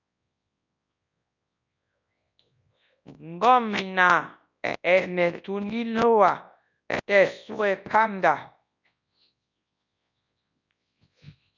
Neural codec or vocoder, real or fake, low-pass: codec, 24 kHz, 0.9 kbps, WavTokenizer, large speech release; fake; 7.2 kHz